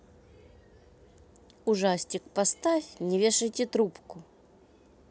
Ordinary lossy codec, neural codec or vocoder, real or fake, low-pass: none; none; real; none